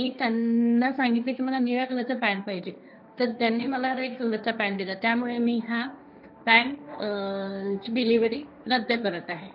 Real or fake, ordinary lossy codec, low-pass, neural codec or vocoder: fake; none; 5.4 kHz; codec, 16 kHz, 1.1 kbps, Voila-Tokenizer